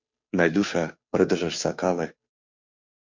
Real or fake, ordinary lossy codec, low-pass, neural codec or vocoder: fake; MP3, 48 kbps; 7.2 kHz; codec, 16 kHz, 2 kbps, FunCodec, trained on Chinese and English, 25 frames a second